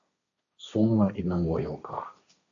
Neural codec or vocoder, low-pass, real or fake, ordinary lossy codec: codec, 16 kHz, 1.1 kbps, Voila-Tokenizer; 7.2 kHz; fake; AAC, 64 kbps